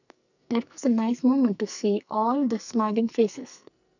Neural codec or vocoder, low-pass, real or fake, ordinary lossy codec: codec, 44.1 kHz, 2.6 kbps, SNAC; 7.2 kHz; fake; none